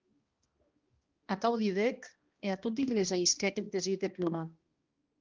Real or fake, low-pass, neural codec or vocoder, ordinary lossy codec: fake; 7.2 kHz; codec, 16 kHz, 1 kbps, X-Codec, HuBERT features, trained on balanced general audio; Opus, 32 kbps